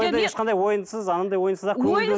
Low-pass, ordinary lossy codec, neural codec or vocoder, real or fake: none; none; none; real